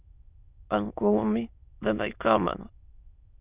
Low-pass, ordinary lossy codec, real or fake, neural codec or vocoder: 3.6 kHz; Opus, 64 kbps; fake; autoencoder, 22.05 kHz, a latent of 192 numbers a frame, VITS, trained on many speakers